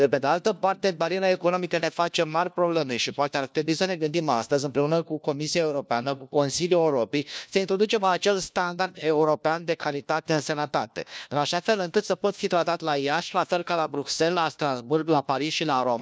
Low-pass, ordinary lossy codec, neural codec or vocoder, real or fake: none; none; codec, 16 kHz, 1 kbps, FunCodec, trained on LibriTTS, 50 frames a second; fake